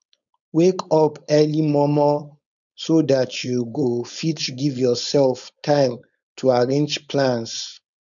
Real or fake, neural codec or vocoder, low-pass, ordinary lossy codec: fake; codec, 16 kHz, 4.8 kbps, FACodec; 7.2 kHz; none